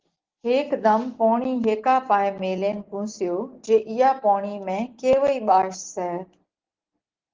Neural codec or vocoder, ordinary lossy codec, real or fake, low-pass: none; Opus, 16 kbps; real; 7.2 kHz